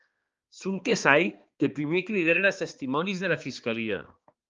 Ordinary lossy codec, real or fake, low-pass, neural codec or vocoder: Opus, 32 kbps; fake; 7.2 kHz; codec, 16 kHz, 2 kbps, X-Codec, HuBERT features, trained on balanced general audio